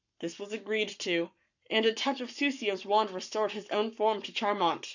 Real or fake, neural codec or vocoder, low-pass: fake; codec, 44.1 kHz, 7.8 kbps, Pupu-Codec; 7.2 kHz